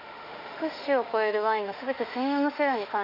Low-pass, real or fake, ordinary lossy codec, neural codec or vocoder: 5.4 kHz; fake; none; autoencoder, 48 kHz, 32 numbers a frame, DAC-VAE, trained on Japanese speech